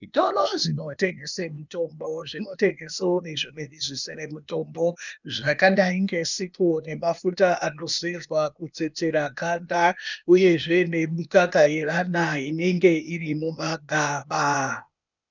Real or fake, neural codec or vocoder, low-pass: fake; codec, 16 kHz, 0.8 kbps, ZipCodec; 7.2 kHz